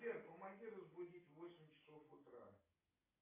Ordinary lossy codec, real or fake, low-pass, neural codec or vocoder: AAC, 16 kbps; real; 3.6 kHz; none